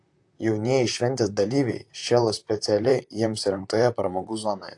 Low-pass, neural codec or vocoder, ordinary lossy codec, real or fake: 10.8 kHz; vocoder, 44.1 kHz, 128 mel bands, Pupu-Vocoder; AAC, 64 kbps; fake